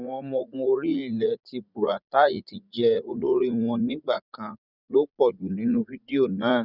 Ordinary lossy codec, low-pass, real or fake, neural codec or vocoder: none; 5.4 kHz; fake; vocoder, 44.1 kHz, 80 mel bands, Vocos